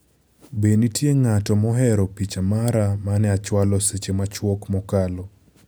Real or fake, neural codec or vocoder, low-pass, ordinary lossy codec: real; none; none; none